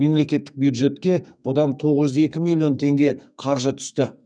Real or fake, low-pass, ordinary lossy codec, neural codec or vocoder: fake; 9.9 kHz; none; codec, 32 kHz, 1.9 kbps, SNAC